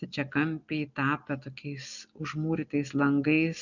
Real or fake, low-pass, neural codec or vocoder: real; 7.2 kHz; none